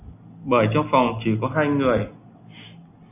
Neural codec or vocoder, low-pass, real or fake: none; 3.6 kHz; real